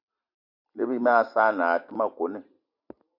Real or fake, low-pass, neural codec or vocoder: fake; 5.4 kHz; vocoder, 44.1 kHz, 128 mel bands every 256 samples, BigVGAN v2